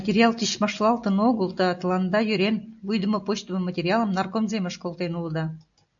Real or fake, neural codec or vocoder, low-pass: real; none; 7.2 kHz